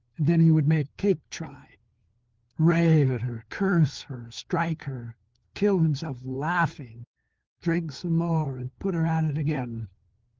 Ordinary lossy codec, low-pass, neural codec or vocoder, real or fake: Opus, 16 kbps; 7.2 kHz; codec, 16 kHz, 4 kbps, FunCodec, trained on LibriTTS, 50 frames a second; fake